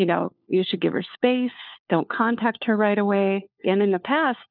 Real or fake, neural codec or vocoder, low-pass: fake; codec, 16 kHz, 4 kbps, FunCodec, trained on LibriTTS, 50 frames a second; 5.4 kHz